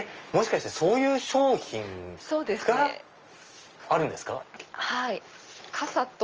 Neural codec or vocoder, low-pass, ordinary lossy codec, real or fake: none; 7.2 kHz; Opus, 24 kbps; real